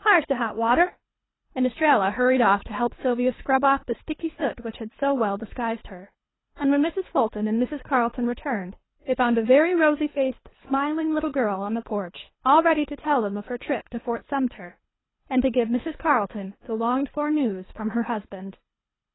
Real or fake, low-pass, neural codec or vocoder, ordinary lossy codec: fake; 7.2 kHz; codec, 24 kHz, 3 kbps, HILCodec; AAC, 16 kbps